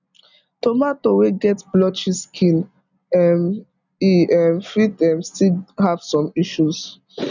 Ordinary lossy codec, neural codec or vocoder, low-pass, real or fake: none; none; 7.2 kHz; real